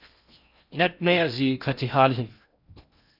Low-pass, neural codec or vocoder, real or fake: 5.4 kHz; codec, 16 kHz in and 24 kHz out, 0.6 kbps, FocalCodec, streaming, 4096 codes; fake